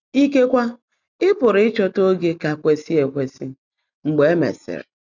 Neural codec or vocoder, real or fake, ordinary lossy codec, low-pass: none; real; none; 7.2 kHz